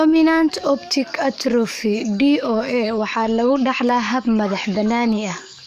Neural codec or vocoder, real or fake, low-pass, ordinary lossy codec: codec, 44.1 kHz, 7.8 kbps, DAC; fake; 14.4 kHz; none